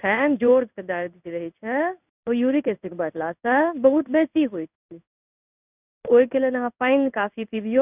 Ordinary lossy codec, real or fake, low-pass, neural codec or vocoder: none; fake; 3.6 kHz; codec, 16 kHz in and 24 kHz out, 1 kbps, XY-Tokenizer